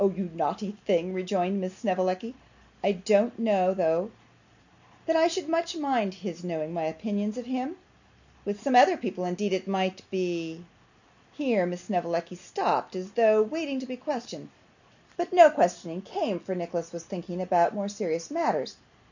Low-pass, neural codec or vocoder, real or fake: 7.2 kHz; none; real